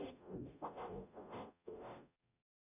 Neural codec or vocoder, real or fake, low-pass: codec, 44.1 kHz, 0.9 kbps, DAC; fake; 3.6 kHz